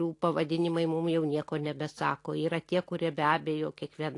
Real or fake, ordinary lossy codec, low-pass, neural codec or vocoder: real; AAC, 48 kbps; 10.8 kHz; none